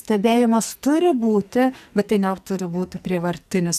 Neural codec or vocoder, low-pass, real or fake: codec, 44.1 kHz, 2.6 kbps, SNAC; 14.4 kHz; fake